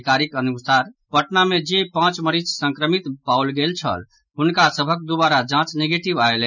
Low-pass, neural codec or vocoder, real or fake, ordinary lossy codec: 7.2 kHz; none; real; none